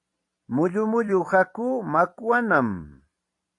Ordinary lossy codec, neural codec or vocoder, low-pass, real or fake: AAC, 48 kbps; vocoder, 44.1 kHz, 128 mel bands every 256 samples, BigVGAN v2; 10.8 kHz; fake